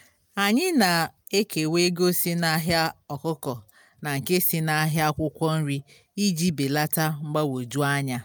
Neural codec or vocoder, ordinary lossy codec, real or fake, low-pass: none; none; real; none